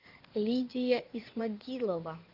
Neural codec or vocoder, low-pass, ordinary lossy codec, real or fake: codec, 16 kHz in and 24 kHz out, 2.2 kbps, FireRedTTS-2 codec; 5.4 kHz; Opus, 24 kbps; fake